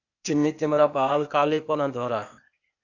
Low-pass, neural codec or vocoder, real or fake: 7.2 kHz; codec, 16 kHz, 0.8 kbps, ZipCodec; fake